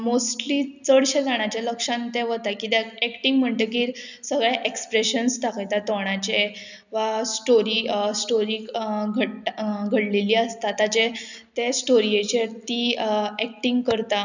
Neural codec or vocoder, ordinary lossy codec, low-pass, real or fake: none; none; 7.2 kHz; real